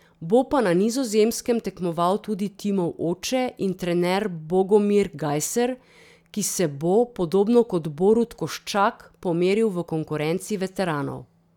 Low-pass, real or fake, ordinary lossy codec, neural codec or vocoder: 19.8 kHz; real; none; none